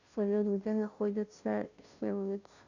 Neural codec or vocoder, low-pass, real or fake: codec, 16 kHz, 0.5 kbps, FunCodec, trained on Chinese and English, 25 frames a second; 7.2 kHz; fake